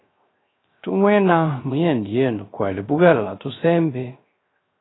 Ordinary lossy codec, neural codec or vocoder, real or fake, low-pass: AAC, 16 kbps; codec, 16 kHz, 0.3 kbps, FocalCodec; fake; 7.2 kHz